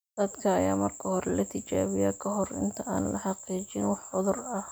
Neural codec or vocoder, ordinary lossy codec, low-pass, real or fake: none; none; none; real